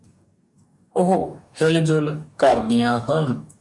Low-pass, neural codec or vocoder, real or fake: 10.8 kHz; codec, 44.1 kHz, 2.6 kbps, DAC; fake